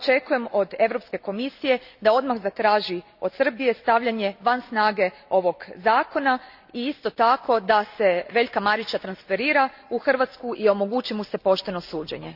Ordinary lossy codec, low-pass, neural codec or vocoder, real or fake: none; 5.4 kHz; none; real